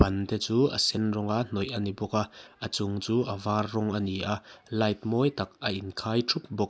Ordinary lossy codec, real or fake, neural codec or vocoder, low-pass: none; real; none; none